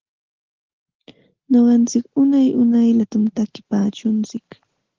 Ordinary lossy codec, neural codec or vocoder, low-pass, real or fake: Opus, 16 kbps; none; 7.2 kHz; real